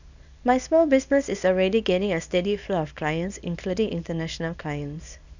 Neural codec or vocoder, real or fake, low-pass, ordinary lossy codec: codec, 24 kHz, 0.9 kbps, WavTokenizer, small release; fake; 7.2 kHz; none